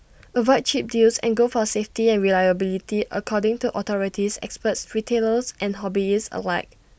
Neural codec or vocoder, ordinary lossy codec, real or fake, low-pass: none; none; real; none